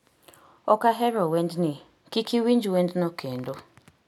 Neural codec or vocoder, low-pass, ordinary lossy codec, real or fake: none; 19.8 kHz; none; real